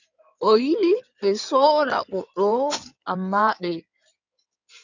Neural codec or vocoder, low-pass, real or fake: codec, 16 kHz in and 24 kHz out, 2.2 kbps, FireRedTTS-2 codec; 7.2 kHz; fake